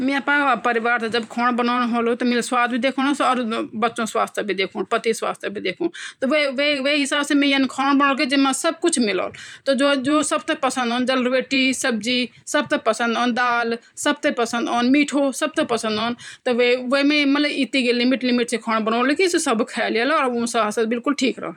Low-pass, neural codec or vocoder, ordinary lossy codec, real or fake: 19.8 kHz; vocoder, 44.1 kHz, 128 mel bands every 512 samples, BigVGAN v2; none; fake